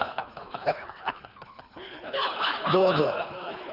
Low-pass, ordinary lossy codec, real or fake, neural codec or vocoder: 5.4 kHz; Opus, 64 kbps; fake; codec, 24 kHz, 3 kbps, HILCodec